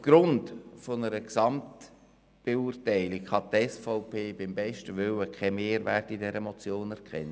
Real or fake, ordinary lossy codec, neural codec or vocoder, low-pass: real; none; none; none